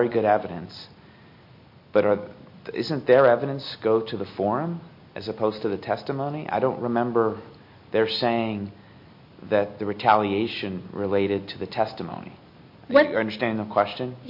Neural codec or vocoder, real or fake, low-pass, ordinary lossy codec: none; real; 5.4 kHz; MP3, 32 kbps